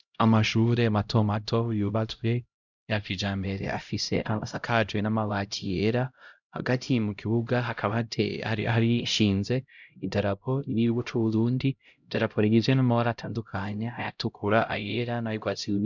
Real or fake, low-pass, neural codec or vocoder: fake; 7.2 kHz; codec, 16 kHz, 0.5 kbps, X-Codec, HuBERT features, trained on LibriSpeech